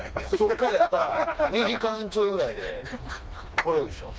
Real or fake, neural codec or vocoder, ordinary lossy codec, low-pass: fake; codec, 16 kHz, 2 kbps, FreqCodec, smaller model; none; none